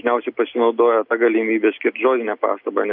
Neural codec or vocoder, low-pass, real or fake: none; 5.4 kHz; real